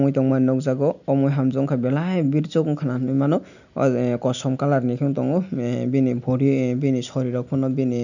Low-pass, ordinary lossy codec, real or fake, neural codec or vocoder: 7.2 kHz; none; real; none